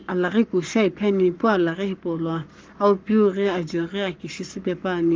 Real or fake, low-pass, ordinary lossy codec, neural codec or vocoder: fake; 7.2 kHz; Opus, 32 kbps; codec, 44.1 kHz, 7.8 kbps, Pupu-Codec